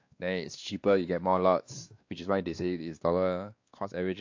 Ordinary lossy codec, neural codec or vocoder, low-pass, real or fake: AAC, 48 kbps; codec, 16 kHz, 2 kbps, X-Codec, WavLM features, trained on Multilingual LibriSpeech; 7.2 kHz; fake